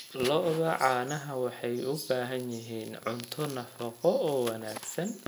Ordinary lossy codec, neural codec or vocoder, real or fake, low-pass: none; none; real; none